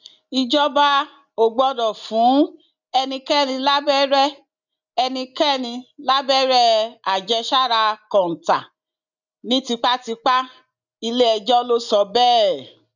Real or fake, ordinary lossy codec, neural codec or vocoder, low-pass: real; none; none; 7.2 kHz